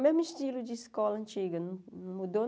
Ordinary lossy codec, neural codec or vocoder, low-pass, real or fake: none; none; none; real